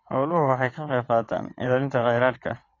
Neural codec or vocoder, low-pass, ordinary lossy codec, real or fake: vocoder, 22.05 kHz, 80 mel bands, WaveNeXt; 7.2 kHz; AAC, 32 kbps; fake